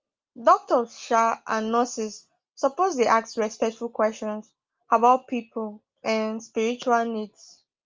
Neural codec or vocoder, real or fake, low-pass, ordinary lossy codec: none; real; 7.2 kHz; Opus, 32 kbps